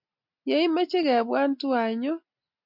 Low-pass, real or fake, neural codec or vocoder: 5.4 kHz; real; none